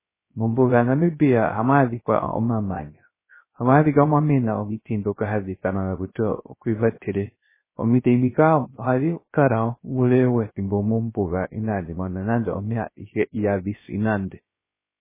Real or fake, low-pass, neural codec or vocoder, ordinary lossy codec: fake; 3.6 kHz; codec, 16 kHz, 0.3 kbps, FocalCodec; MP3, 16 kbps